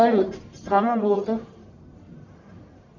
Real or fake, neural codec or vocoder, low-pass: fake; codec, 44.1 kHz, 1.7 kbps, Pupu-Codec; 7.2 kHz